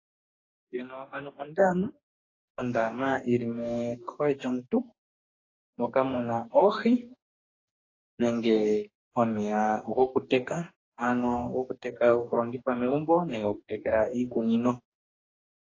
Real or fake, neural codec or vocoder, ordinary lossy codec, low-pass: fake; codec, 44.1 kHz, 2.6 kbps, DAC; AAC, 32 kbps; 7.2 kHz